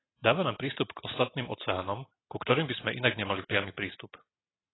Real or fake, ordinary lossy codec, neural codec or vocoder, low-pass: real; AAC, 16 kbps; none; 7.2 kHz